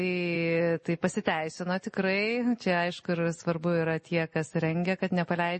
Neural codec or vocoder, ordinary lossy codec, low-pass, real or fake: none; MP3, 32 kbps; 10.8 kHz; real